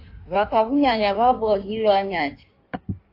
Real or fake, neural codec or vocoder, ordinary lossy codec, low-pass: fake; codec, 16 kHz in and 24 kHz out, 1.1 kbps, FireRedTTS-2 codec; AAC, 32 kbps; 5.4 kHz